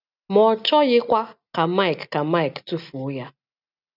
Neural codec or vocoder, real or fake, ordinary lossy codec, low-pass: none; real; none; 5.4 kHz